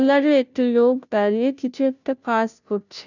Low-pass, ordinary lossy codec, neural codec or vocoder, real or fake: 7.2 kHz; none; codec, 16 kHz, 0.5 kbps, FunCodec, trained on Chinese and English, 25 frames a second; fake